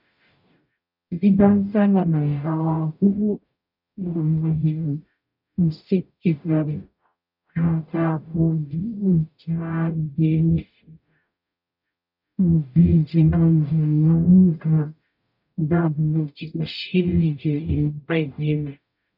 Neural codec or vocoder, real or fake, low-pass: codec, 44.1 kHz, 0.9 kbps, DAC; fake; 5.4 kHz